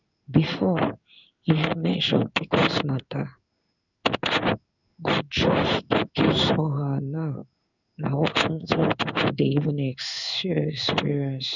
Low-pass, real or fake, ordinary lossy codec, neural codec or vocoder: 7.2 kHz; fake; none; codec, 16 kHz in and 24 kHz out, 1 kbps, XY-Tokenizer